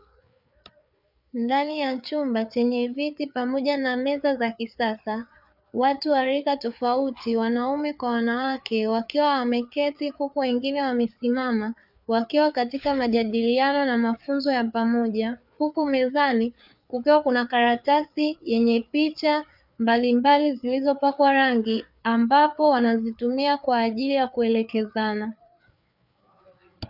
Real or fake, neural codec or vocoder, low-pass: fake; codec, 16 kHz, 4 kbps, FreqCodec, larger model; 5.4 kHz